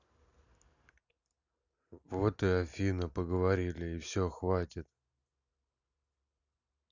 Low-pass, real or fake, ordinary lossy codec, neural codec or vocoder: 7.2 kHz; real; none; none